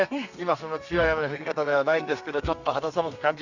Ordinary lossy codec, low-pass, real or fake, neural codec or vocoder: none; 7.2 kHz; fake; codec, 32 kHz, 1.9 kbps, SNAC